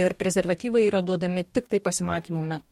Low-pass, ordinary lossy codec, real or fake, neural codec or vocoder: 19.8 kHz; MP3, 64 kbps; fake; codec, 44.1 kHz, 2.6 kbps, DAC